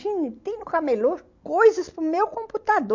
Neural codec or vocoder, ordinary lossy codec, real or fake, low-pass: none; MP3, 48 kbps; real; 7.2 kHz